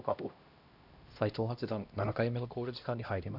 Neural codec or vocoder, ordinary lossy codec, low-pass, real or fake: codec, 16 kHz, 1 kbps, X-Codec, HuBERT features, trained on LibriSpeech; none; 5.4 kHz; fake